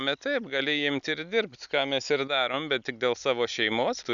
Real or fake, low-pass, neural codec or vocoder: real; 7.2 kHz; none